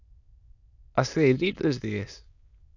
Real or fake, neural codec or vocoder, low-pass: fake; autoencoder, 22.05 kHz, a latent of 192 numbers a frame, VITS, trained on many speakers; 7.2 kHz